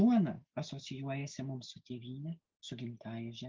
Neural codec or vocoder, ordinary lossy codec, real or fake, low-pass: none; Opus, 32 kbps; real; 7.2 kHz